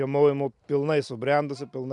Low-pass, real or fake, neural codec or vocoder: 10.8 kHz; real; none